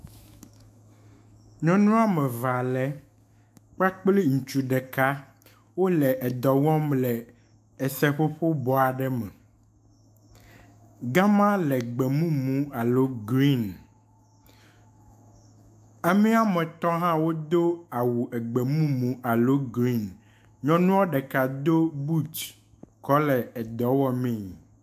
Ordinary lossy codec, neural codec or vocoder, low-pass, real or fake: AAC, 96 kbps; autoencoder, 48 kHz, 128 numbers a frame, DAC-VAE, trained on Japanese speech; 14.4 kHz; fake